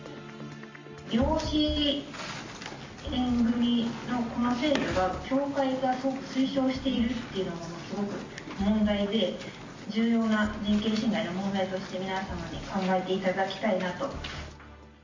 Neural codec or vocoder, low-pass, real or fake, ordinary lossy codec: none; 7.2 kHz; real; MP3, 48 kbps